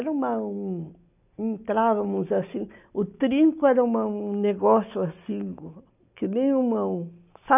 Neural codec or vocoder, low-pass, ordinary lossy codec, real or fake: none; 3.6 kHz; none; real